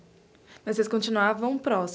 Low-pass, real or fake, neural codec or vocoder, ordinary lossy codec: none; real; none; none